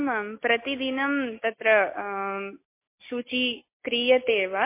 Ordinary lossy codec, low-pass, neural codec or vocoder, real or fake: MP3, 24 kbps; 3.6 kHz; none; real